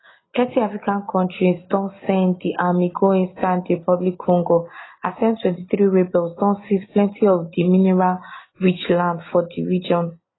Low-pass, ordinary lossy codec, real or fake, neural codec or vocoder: 7.2 kHz; AAC, 16 kbps; real; none